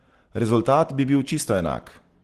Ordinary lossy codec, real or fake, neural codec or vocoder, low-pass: Opus, 16 kbps; real; none; 14.4 kHz